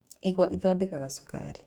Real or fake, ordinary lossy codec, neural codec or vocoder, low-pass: fake; none; codec, 44.1 kHz, 2.6 kbps, DAC; 19.8 kHz